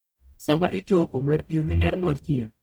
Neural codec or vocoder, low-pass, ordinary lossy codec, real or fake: codec, 44.1 kHz, 0.9 kbps, DAC; none; none; fake